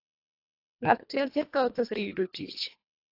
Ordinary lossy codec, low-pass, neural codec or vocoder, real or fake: AAC, 32 kbps; 5.4 kHz; codec, 24 kHz, 1.5 kbps, HILCodec; fake